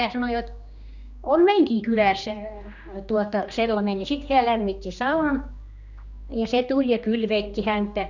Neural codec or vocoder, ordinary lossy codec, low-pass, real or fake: codec, 16 kHz, 2 kbps, X-Codec, HuBERT features, trained on general audio; none; 7.2 kHz; fake